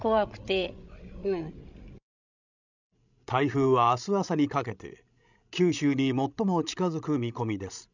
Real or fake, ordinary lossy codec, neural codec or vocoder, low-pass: fake; none; codec, 16 kHz, 16 kbps, FreqCodec, larger model; 7.2 kHz